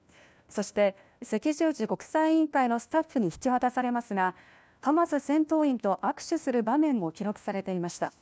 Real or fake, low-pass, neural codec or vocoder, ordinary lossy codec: fake; none; codec, 16 kHz, 1 kbps, FunCodec, trained on LibriTTS, 50 frames a second; none